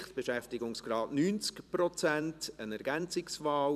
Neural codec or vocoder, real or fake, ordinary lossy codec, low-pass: vocoder, 44.1 kHz, 128 mel bands every 256 samples, BigVGAN v2; fake; none; 14.4 kHz